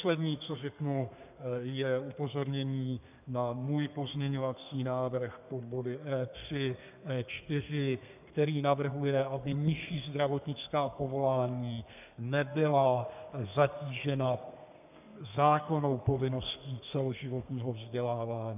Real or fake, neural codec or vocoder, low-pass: fake; codec, 32 kHz, 1.9 kbps, SNAC; 3.6 kHz